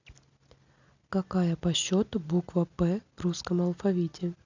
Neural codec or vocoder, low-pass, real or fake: none; 7.2 kHz; real